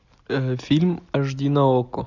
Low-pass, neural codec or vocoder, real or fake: 7.2 kHz; none; real